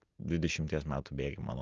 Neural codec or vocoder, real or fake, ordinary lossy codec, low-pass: none; real; Opus, 32 kbps; 7.2 kHz